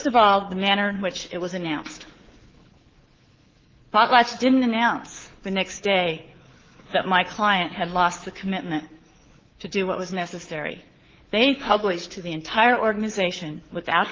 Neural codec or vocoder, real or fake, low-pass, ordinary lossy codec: codec, 24 kHz, 3.1 kbps, DualCodec; fake; 7.2 kHz; Opus, 16 kbps